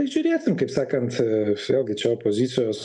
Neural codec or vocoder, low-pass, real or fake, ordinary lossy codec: none; 10.8 kHz; real; MP3, 96 kbps